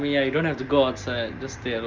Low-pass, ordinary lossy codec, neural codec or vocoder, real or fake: 7.2 kHz; Opus, 24 kbps; none; real